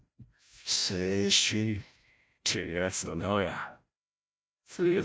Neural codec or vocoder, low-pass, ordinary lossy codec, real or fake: codec, 16 kHz, 0.5 kbps, FreqCodec, larger model; none; none; fake